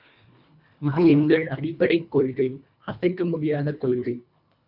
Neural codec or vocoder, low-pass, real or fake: codec, 24 kHz, 1.5 kbps, HILCodec; 5.4 kHz; fake